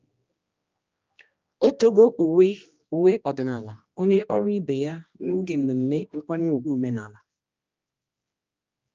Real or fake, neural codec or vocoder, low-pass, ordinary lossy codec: fake; codec, 16 kHz, 1 kbps, X-Codec, HuBERT features, trained on general audio; 7.2 kHz; Opus, 24 kbps